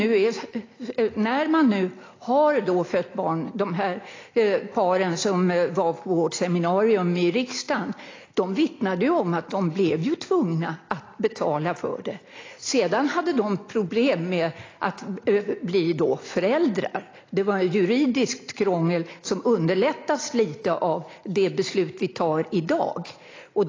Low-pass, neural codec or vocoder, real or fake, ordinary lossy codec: 7.2 kHz; none; real; AAC, 32 kbps